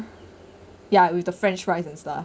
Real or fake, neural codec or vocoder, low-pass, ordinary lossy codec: real; none; none; none